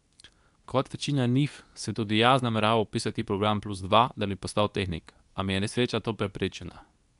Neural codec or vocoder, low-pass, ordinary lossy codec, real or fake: codec, 24 kHz, 0.9 kbps, WavTokenizer, medium speech release version 2; 10.8 kHz; none; fake